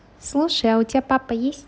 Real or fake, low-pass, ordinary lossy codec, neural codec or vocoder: real; none; none; none